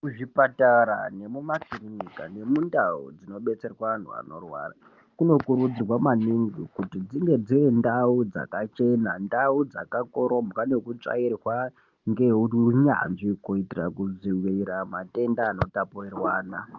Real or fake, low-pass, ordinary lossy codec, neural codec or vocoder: real; 7.2 kHz; Opus, 24 kbps; none